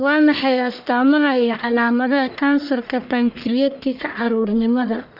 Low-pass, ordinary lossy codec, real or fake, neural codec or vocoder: 5.4 kHz; none; fake; codec, 44.1 kHz, 1.7 kbps, Pupu-Codec